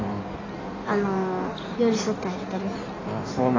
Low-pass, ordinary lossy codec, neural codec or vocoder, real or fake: 7.2 kHz; AAC, 32 kbps; codec, 16 kHz in and 24 kHz out, 1.1 kbps, FireRedTTS-2 codec; fake